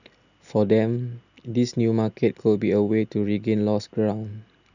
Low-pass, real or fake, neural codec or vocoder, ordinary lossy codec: 7.2 kHz; real; none; none